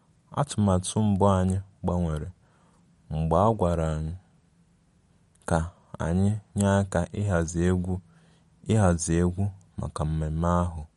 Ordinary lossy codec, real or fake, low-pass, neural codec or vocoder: MP3, 48 kbps; real; 19.8 kHz; none